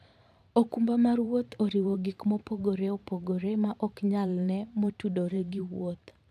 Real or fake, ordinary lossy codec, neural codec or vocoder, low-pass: fake; none; vocoder, 44.1 kHz, 128 mel bands every 512 samples, BigVGAN v2; 14.4 kHz